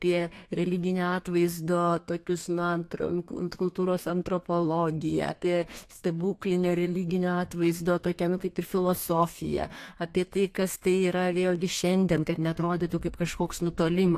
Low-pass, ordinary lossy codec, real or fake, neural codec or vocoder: 14.4 kHz; AAC, 64 kbps; fake; codec, 32 kHz, 1.9 kbps, SNAC